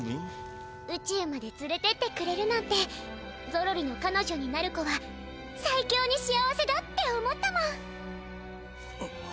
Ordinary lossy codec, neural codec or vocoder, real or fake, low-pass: none; none; real; none